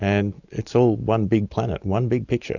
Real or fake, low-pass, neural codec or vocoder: real; 7.2 kHz; none